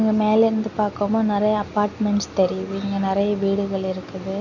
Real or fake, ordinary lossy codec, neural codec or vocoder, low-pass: real; none; none; 7.2 kHz